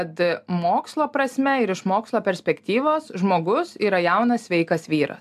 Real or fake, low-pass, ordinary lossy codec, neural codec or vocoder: real; 14.4 kHz; AAC, 96 kbps; none